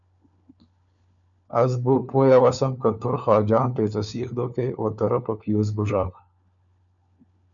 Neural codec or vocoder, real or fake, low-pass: codec, 16 kHz, 4 kbps, FunCodec, trained on LibriTTS, 50 frames a second; fake; 7.2 kHz